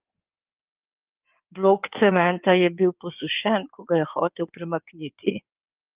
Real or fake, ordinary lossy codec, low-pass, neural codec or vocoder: fake; Opus, 32 kbps; 3.6 kHz; codec, 16 kHz in and 24 kHz out, 2.2 kbps, FireRedTTS-2 codec